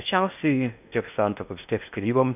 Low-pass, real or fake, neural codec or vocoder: 3.6 kHz; fake; codec, 16 kHz in and 24 kHz out, 0.6 kbps, FocalCodec, streaming, 2048 codes